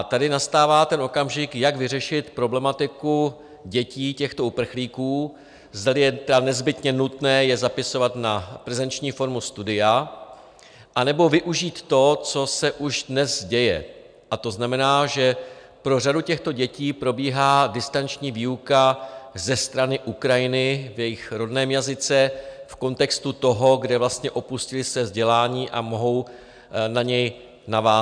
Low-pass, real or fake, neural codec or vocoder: 9.9 kHz; real; none